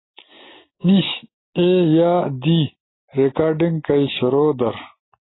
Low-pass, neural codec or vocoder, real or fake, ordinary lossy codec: 7.2 kHz; none; real; AAC, 16 kbps